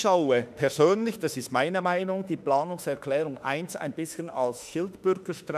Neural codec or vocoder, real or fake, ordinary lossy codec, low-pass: autoencoder, 48 kHz, 32 numbers a frame, DAC-VAE, trained on Japanese speech; fake; none; 14.4 kHz